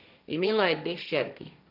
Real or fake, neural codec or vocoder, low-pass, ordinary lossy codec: fake; codec, 16 kHz, 1.1 kbps, Voila-Tokenizer; 5.4 kHz; none